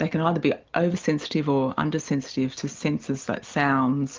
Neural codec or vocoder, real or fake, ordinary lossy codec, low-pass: none; real; Opus, 32 kbps; 7.2 kHz